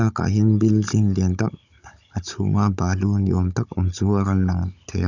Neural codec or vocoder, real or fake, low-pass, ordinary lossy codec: codec, 16 kHz, 16 kbps, FunCodec, trained on Chinese and English, 50 frames a second; fake; 7.2 kHz; none